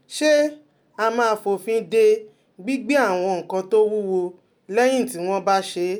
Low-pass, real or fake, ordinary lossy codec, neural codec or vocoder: none; real; none; none